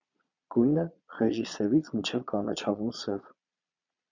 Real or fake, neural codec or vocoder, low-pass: fake; vocoder, 22.05 kHz, 80 mel bands, Vocos; 7.2 kHz